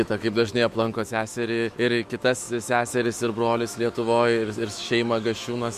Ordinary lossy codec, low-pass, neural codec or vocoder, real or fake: MP3, 64 kbps; 14.4 kHz; autoencoder, 48 kHz, 128 numbers a frame, DAC-VAE, trained on Japanese speech; fake